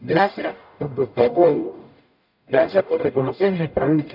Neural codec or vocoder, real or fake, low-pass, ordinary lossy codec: codec, 44.1 kHz, 0.9 kbps, DAC; fake; 5.4 kHz; none